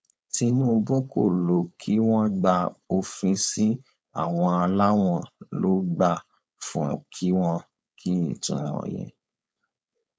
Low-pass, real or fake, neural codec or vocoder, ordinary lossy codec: none; fake; codec, 16 kHz, 4.8 kbps, FACodec; none